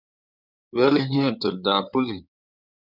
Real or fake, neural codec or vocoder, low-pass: fake; codec, 16 kHz in and 24 kHz out, 2.2 kbps, FireRedTTS-2 codec; 5.4 kHz